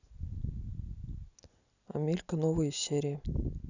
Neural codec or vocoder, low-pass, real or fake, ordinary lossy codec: none; 7.2 kHz; real; none